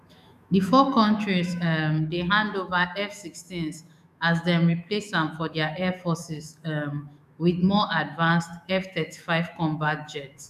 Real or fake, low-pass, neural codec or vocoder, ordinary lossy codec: fake; 14.4 kHz; autoencoder, 48 kHz, 128 numbers a frame, DAC-VAE, trained on Japanese speech; none